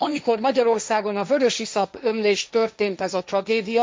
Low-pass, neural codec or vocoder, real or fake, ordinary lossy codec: none; codec, 16 kHz, 1.1 kbps, Voila-Tokenizer; fake; none